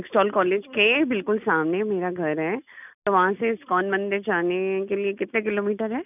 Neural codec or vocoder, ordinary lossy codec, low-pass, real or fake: none; none; 3.6 kHz; real